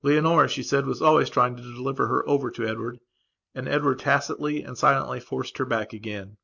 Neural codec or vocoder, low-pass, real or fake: none; 7.2 kHz; real